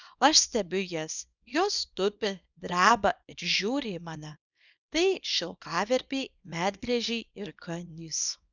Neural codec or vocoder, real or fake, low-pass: codec, 24 kHz, 0.9 kbps, WavTokenizer, small release; fake; 7.2 kHz